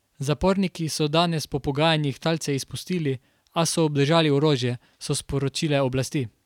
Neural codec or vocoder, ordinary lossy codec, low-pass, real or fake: none; none; 19.8 kHz; real